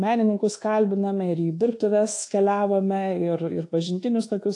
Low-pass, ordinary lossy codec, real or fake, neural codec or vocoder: 10.8 kHz; AAC, 48 kbps; fake; codec, 24 kHz, 1.2 kbps, DualCodec